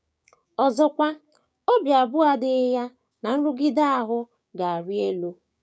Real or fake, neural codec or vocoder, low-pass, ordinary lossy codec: fake; codec, 16 kHz, 6 kbps, DAC; none; none